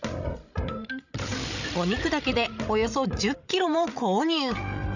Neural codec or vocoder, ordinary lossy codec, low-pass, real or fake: codec, 16 kHz, 16 kbps, FreqCodec, larger model; none; 7.2 kHz; fake